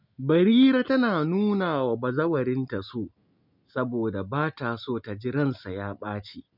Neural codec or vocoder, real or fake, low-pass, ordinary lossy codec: none; real; 5.4 kHz; none